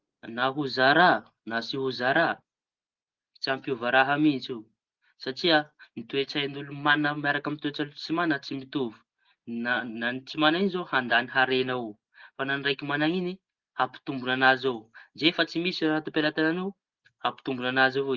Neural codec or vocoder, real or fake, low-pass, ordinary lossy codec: none; real; 7.2 kHz; Opus, 16 kbps